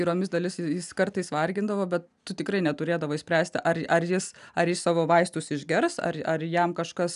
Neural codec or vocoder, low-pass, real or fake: none; 10.8 kHz; real